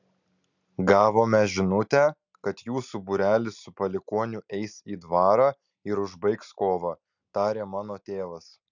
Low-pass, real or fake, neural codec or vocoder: 7.2 kHz; real; none